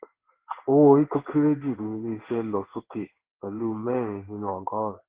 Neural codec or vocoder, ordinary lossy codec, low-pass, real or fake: codec, 16 kHz in and 24 kHz out, 1 kbps, XY-Tokenizer; Opus, 16 kbps; 3.6 kHz; fake